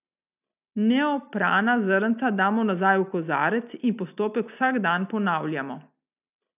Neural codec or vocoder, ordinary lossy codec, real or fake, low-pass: none; none; real; 3.6 kHz